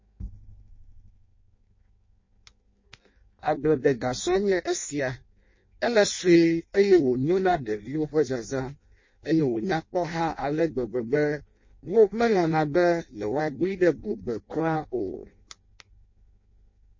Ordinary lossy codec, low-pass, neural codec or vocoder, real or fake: MP3, 32 kbps; 7.2 kHz; codec, 16 kHz in and 24 kHz out, 0.6 kbps, FireRedTTS-2 codec; fake